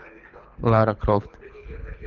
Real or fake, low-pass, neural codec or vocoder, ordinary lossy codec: fake; 7.2 kHz; codec, 24 kHz, 6 kbps, HILCodec; Opus, 24 kbps